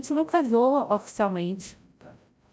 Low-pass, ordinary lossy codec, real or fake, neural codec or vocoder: none; none; fake; codec, 16 kHz, 0.5 kbps, FreqCodec, larger model